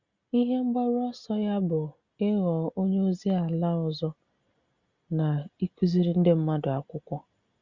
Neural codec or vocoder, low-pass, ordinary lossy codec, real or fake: none; 7.2 kHz; Opus, 64 kbps; real